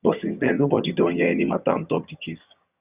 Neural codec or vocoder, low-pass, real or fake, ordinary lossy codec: vocoder, 22.05 kHz, 80 mel bands, HiFi-GAN; 3.6 kHz; fake; Opus, 64 kbps